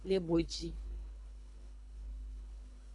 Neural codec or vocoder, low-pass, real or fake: codec, 24 kHz, 3 kbps, HILCodec; 10.8 kHz; fake